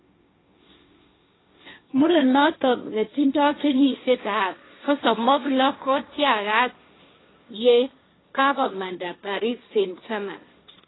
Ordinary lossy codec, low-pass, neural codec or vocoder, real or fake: AAC, 16 kbps; 7.2 kHz; codec, 16 kHz, 1.1 kbps, Voila-Tokenizer; fake